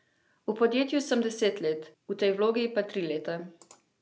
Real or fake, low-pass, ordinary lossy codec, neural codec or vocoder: real; none; none; none